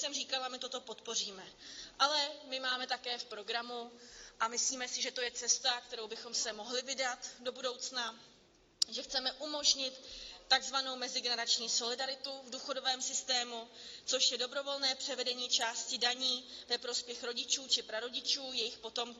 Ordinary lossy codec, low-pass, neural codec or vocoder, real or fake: AAC, 32 kbps; 7.2 kHz; none; real